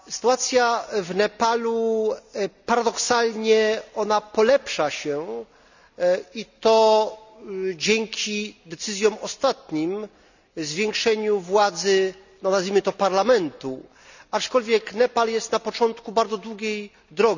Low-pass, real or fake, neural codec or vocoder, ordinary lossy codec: 7.2 kHz; real; none; none